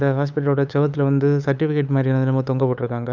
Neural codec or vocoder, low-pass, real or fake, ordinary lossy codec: codec, 16 kHz, 8 kbps, FunCodec, trained on LibriTTS, 25 frames a second; 7.2 kHz; fake; none